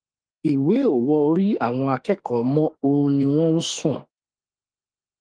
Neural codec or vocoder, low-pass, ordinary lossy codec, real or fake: autoencoder, 48 kHz, 32 numbers a frame, DAC-VAE, trained on Japanese speech; 9.9 kHz; Opus, 24 kbps; fake